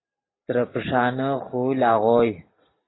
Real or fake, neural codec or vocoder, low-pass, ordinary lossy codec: real; none; 7.2 kHz; AAC, 16 kbps